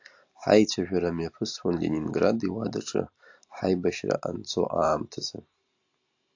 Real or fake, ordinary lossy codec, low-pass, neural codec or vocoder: real; AAC, 48 kbps; 7.2 kHz; none